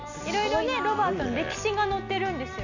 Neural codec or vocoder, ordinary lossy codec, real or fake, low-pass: none; none; real; 7.2 kHz